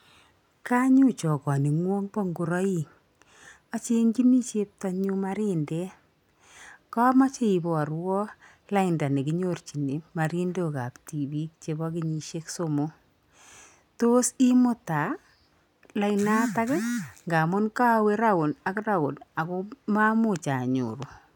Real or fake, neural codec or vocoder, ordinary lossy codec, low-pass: real; none; none; 19.8 kHz